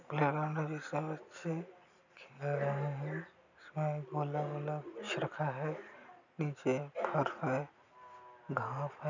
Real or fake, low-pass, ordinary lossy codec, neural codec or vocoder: real; 7.2 kHz; none; none